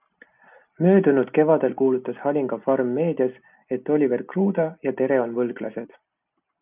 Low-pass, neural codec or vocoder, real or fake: 3.6 kHz; none; real